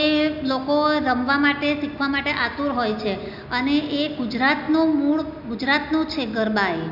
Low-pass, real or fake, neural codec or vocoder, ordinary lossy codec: 5.4 kHz; real; none; none